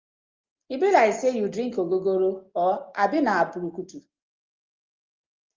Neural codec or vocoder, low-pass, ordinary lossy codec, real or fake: none; 7.2 kHz; Opus, 32 kbps; real